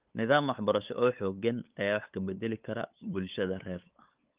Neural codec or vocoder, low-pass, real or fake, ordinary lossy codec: codec, 16 kHz, 8 kbps, FunCodec, trained on LibriTTS, 25 frames a second; 3.6 kHz; fake; Opus, 24 kbps